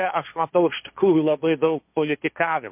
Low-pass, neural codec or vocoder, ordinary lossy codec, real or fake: 3.6 kHz; codec, 16 kHz, 1.1 kbps, Voila-Tokenizer; MP3, 32 kbps; fake